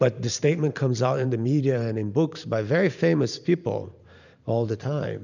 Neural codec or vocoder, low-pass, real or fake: vocoder, 44.1 kHz, 128 mel bands every 512 samples, BigVGAN v2; 7.2 kHz; fake